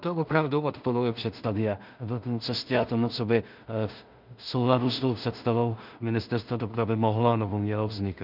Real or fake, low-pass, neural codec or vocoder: fake; 5.4 kHz; codec, 16 kHz in and 24 kHz out, 0.4 kbps, LongCat-Audio-Codec, two codebook decoder